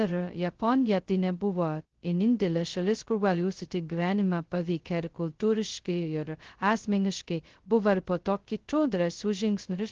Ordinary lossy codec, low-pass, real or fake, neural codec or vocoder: Opus, 16 kbps; 7.2 kHz; fake; codec, 16 kHz, 0.2 kbps, FocalCodec